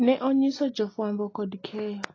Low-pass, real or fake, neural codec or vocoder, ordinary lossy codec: 7.2 kHz; real; none; AAC, 32 kbps